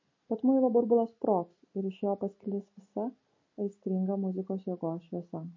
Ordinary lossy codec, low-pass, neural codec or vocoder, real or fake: MP3, 32 kbps; 7.2 kHz; none; real